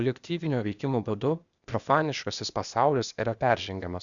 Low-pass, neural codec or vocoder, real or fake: 7.2 kHz; codec, 16 kHz, 0.8 kbps, ZipCodec; fake